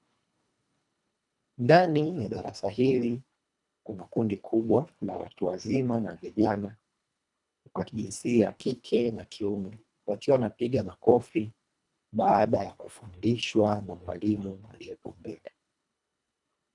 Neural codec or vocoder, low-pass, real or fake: codec, 24 kHz, 1.5 kbps, HILCodec; 10.8 kHz; fake